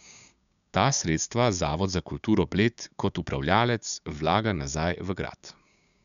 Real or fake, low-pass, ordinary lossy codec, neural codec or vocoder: fake; 7.2 kHz; none; codec, 16 kHz, 6 kbps, DAC